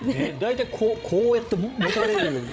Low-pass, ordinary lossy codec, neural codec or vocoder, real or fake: none; none; codec, 16 kHz, 16 kbps, FreqCodec, larger model; fake